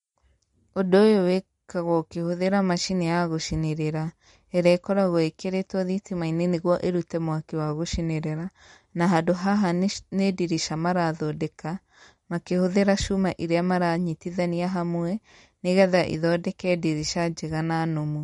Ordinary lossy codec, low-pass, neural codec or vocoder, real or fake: MP3, 48 kbps; 19.8 kHz; none; real